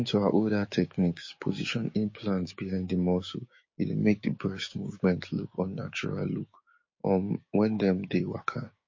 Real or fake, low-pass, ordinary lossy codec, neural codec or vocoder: fake; 7.2 kHz; MP3, 32 kbps; codec, 16 kHz, 6 kbps, DAC